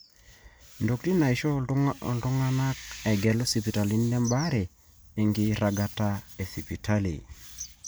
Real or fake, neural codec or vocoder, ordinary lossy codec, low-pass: real; none; none; none